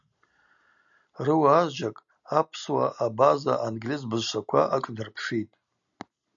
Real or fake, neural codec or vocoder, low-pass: real; none; 7.2 kHz